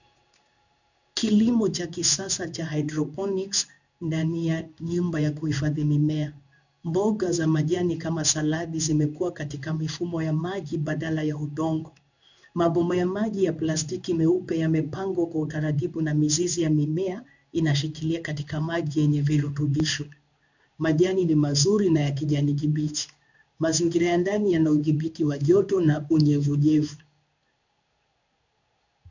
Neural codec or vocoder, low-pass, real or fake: codec, 16 kHz in and 24 kHz out, 1 kbps, XY-Tokenizer; 7.2 kHz; fake